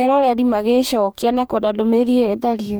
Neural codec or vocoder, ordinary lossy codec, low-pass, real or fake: codec, 44.1 kHz, 2.6 kbps, DAC; none; none; fake